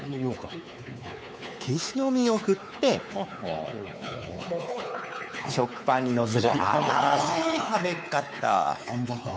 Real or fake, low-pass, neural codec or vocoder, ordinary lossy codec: fake; none; codec, 16 kHz, 4 kbps, X-Codec, WavLM features, trained on Multilingual LibriSpeech; none